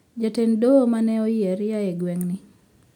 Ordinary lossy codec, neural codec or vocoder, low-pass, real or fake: none; none; 19.8 kHz; real